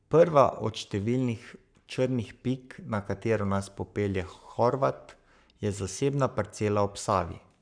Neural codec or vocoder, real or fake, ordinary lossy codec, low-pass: codec, 44.1 kHz, 7.8 kbps, Pupu-Codec; fake; none; 9.9 kHz